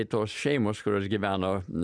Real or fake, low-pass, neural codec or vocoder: real; 9.9 kHz; none